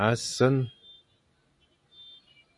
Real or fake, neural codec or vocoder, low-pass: real; none; 10.8 kHz